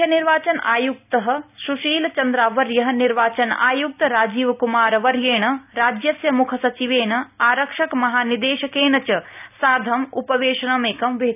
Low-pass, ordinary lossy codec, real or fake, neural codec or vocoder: 3.6 kHz; none; real; none